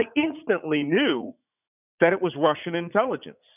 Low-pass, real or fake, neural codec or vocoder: 3.6 kHz; fake; vocoder, 44.1 kHz, 80 mel bands, Vocos